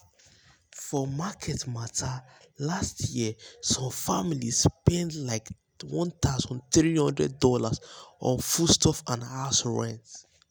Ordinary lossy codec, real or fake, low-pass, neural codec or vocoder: none; real; none; none